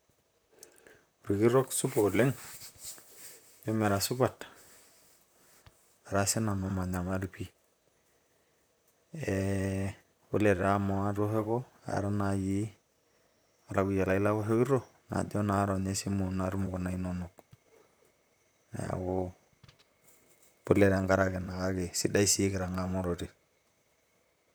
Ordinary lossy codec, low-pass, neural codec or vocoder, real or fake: none; none; vocoder, 44.1 kHz, 128 mel bands, Pupu-Vocoder; fake